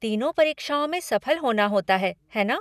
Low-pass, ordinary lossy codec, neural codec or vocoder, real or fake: 14.4 kHz; none; none; real